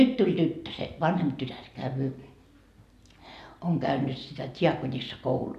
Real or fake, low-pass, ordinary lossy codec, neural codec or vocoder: real; 14.4 kHz; none; none